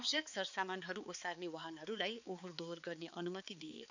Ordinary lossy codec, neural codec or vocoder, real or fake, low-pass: none; codec, 16 kHz, 4 kbps, X-Codec, HuBERT features, trained on balanced general audio; fake; 7.2 kHz